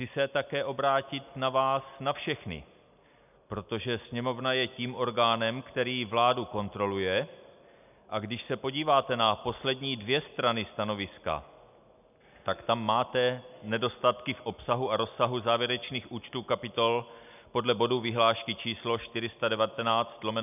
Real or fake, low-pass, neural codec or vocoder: real; 3.6 kHz; none